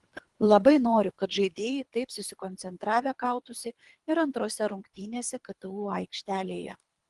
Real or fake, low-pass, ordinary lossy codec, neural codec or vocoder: fake; 10.8 kHz; Opus, 24 kbps; codec, 24 kHz, 3 kbps, HILCodec